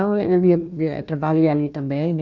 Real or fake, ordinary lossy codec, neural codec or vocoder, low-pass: fake; none; codec, 16 kHz, 1 kbps, FunCodec, trained on Chinese and English, 50 frames a second; 7.2 kHz